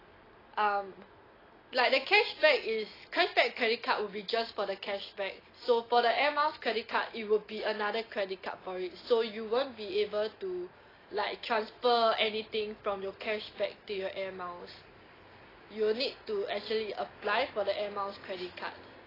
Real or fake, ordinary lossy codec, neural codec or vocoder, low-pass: real; AAC, 24 kbps; none; 5.4 kHz